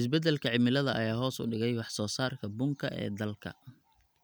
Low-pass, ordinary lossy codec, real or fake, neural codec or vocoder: none; none; real; none